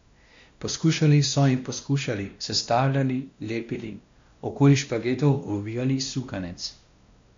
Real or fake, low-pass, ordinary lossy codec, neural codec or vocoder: fake; 7.2 kHz; MP3, 64 kbps; codec, 16 kHz, 1 kbps, X-Codec, WavLM features, trained on Multilingual LibriSpeech